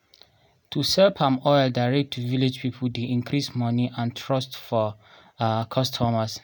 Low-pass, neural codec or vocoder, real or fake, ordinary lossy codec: none; none; real; none